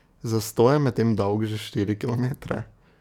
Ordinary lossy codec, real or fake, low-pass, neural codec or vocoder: none; fake; 19.8 kHz; codec, 44.1 kHz, 7.8 kbps, DAC